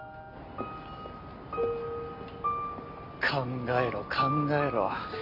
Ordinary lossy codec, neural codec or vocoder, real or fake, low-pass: MP3, 32 kbps; none; real; 5.4 kHz